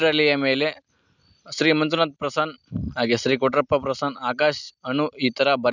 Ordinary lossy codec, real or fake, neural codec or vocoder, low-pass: none; real; none; 7.2 kHz